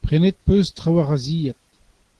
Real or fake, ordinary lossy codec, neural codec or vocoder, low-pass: real; Opus, 16 kbps; none; 10.8 kHz